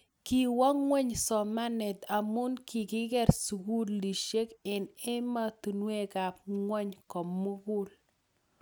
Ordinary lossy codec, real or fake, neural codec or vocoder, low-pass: none; real; none; none